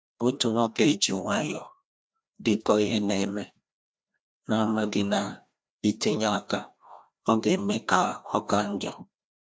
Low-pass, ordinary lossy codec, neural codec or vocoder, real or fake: none; none; codec, 16 kHz, 1 kbps, FreqCodec, larger model; fake